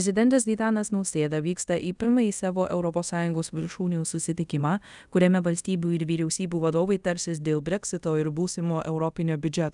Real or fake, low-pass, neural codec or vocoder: fake; 10.8 kHz; codec, 24 kHz, 0.5 kbps, DualCodec